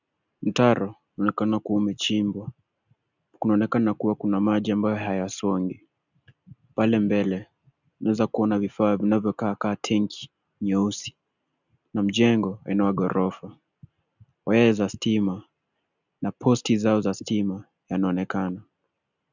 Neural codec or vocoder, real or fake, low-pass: none; real; 7.2 kHz